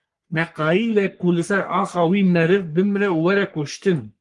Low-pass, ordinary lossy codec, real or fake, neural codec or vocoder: 10.8 kHz; Opus, 24 kbps; fake; codec, 44.1 kHz, 3.4 kbps, Pupu-Codec